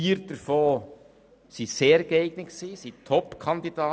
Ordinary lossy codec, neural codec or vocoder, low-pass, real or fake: none; none; none; real